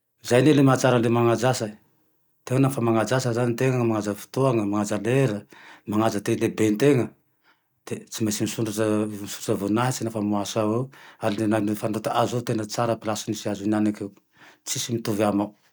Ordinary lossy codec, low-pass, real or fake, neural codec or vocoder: none; none; real; none